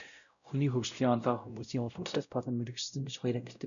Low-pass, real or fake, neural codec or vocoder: 7.2 kHz; fake; codec, 16 kHz, 0.5 kbps, X-Codec, WavLM features, trained on Multilingual LibriSpeech